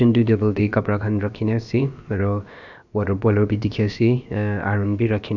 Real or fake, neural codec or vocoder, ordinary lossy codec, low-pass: fake; codec, 16 kHz, about 1 kbps, DyCAST, with the encoder's durations; none; 7.2 kHz